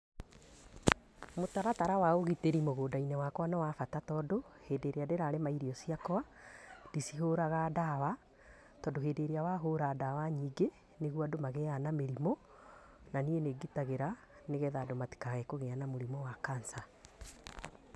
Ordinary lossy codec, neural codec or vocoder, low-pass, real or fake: none; none; none; real